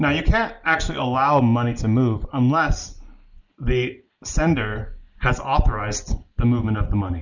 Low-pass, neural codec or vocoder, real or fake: 7.2 kHz; none; real